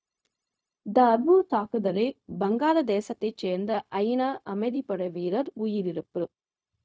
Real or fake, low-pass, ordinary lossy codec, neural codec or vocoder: fake; none; none; codec, 16 kHz, 0.4 kbps, LongCat-Audio-Codec